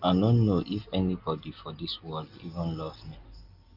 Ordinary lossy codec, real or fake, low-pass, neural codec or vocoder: Opus, 24 kbps; real; 5.4 kHz; none